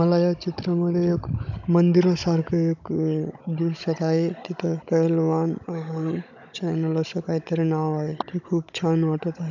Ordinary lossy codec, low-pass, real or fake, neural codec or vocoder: none; 7.2 kHz; fake; codec, 16 kHz, 16 kbps, FunCodec, trained on Chinese and English, 50 frames a second